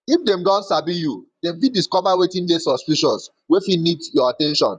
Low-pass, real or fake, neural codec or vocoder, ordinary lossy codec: 10.8 kHz; fake; vocoder, 44.1 kHz, 128 mel bands, Pupu-Vocoder; none